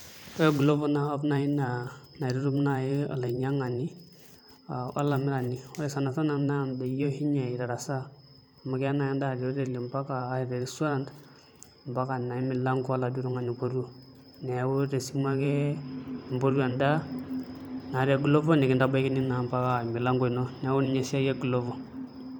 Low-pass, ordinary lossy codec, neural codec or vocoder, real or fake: none; none; vocoder, 44.1 kHz, 128 mel bands every 512 samples, BigVGAN v2; fake